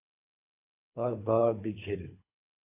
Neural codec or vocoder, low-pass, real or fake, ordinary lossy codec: codec, 24 kHz, 3 kbps, HILCodec; 3.6 kHz; fake; AAC, 24 kbps